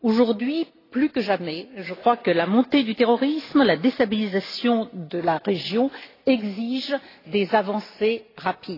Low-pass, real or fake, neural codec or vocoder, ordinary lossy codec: 5.4 kHz; real; none; AAC, 24 kbps